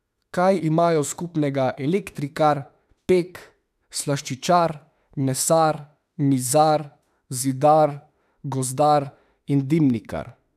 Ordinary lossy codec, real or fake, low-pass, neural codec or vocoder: none; fake; 14.4 kHz; autoencoder, 48 kHz, 32 numbers a frame, DAC-VAE, trained on Japanese speech